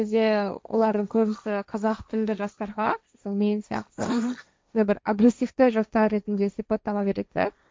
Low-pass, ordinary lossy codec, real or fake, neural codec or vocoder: none; none; fake; codec, 16 kHz, 1.1 kbps, Voila-Tokenizer